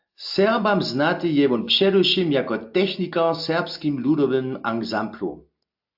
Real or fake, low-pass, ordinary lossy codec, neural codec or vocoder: real; 5.4 kHz; AAC, 48 kbps; none